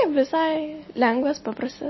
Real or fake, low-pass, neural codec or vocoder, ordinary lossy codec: real; 7.2 kHz; none; MP3, 24 kbps